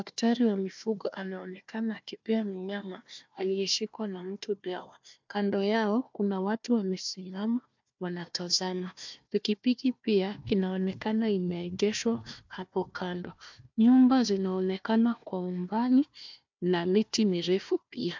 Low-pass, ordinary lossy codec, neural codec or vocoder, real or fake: 7.2 kHz; MP3, 64 kbps; codec, 16 kHz, 1 kbps, FunCodec, trained on Chinese and English, 50 frames a second; fake